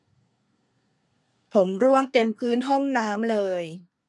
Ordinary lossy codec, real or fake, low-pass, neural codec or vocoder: none; fake; 10.8 kHz; codec, 24 kHz, 1 kbps, SNAC